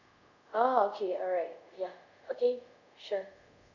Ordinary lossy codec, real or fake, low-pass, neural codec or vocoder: none; fake; 7.2 kHz; codec, 24 kHz, 0.5 kbps, DualCodec